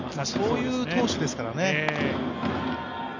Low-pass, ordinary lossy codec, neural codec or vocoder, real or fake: 7.2 kHz; none; none; real